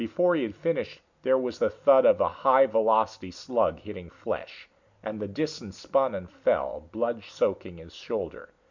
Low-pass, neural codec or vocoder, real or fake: 7.2 kHz; none; real